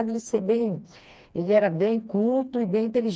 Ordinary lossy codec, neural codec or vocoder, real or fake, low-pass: none; codec, 16 kHz, 2 kbps, FreqCodec, smaller model; fake; none